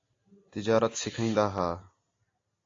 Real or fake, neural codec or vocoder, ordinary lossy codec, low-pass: real; none; AAC, 32 kbps; 7.2 kHz